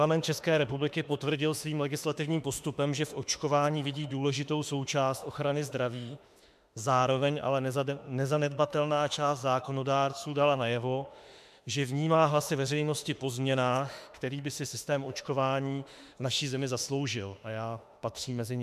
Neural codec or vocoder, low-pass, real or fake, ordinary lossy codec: autoencoder, 48 kHz, 32 numbers a frame, DAC-VAE, trained on Japanese speech; 14.4 kHz; fake; MP3, 96 kbps